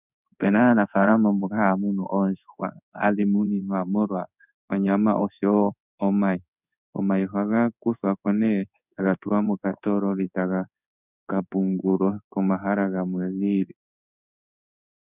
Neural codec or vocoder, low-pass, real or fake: codec, 16 kHz in and 24 kHz out, 1 kbps, XY-Tokenizer; 3.6 kHz; fake